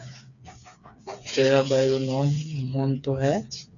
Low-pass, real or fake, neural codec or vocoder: 7.2 kHz; fake; codec, 16 kHz, 4 kbps, FreqCodec, smaller model